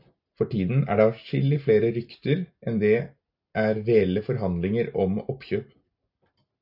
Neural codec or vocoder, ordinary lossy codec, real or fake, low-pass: none; AAC, 48 kbps; real; 5.4 kHz